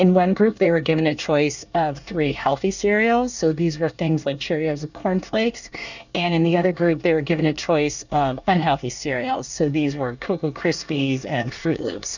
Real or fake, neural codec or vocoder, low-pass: fake; codec, 24 kHz, 1 kbps, SNAC; 7.2 kHz